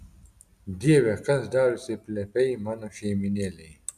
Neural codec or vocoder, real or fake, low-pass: none; real; 14.4 kHz